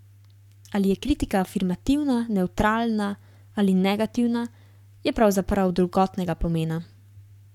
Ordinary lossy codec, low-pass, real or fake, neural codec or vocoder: none; 19.8 kHz; fake; codec, 44.1 kHz, 7.8 kbps, Pupu-Codec